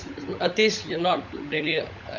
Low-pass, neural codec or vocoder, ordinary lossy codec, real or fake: 7.2 kHz; codec, 16 kHz, 16 kbps, FunCodec, trained on LibriTTS, 50 frames a second; none; fake